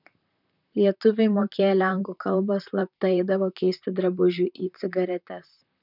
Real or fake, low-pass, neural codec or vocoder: fake; 5.4 kHz; vocoder, 44.1 kHz, 128 mel bands, Pupu-Vocoder